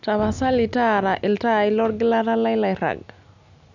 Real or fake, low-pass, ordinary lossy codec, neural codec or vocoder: real; 7.2 kHz; none; none